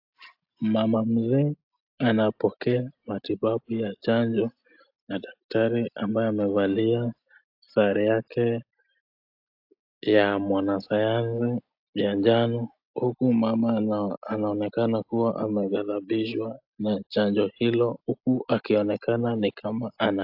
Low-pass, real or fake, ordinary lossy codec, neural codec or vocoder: 5.4 kHz; real; AAC, 48 kbps; none